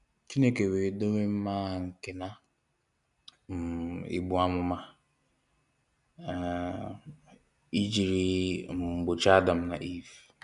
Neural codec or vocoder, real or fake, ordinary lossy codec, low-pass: none; real; none; 10.8 kHz